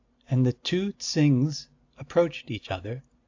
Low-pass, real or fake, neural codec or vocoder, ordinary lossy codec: 7.2 kHz; real; none; AAC, 48 kbps